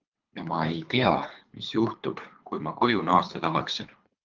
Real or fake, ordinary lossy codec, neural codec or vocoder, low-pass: fake; Opus, 24 kbps; codec, 24 kHz, 3 kbps, HILCodec; 7.2 kHz